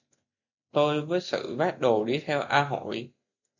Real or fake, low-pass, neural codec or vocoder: real; 7.2 kHz; none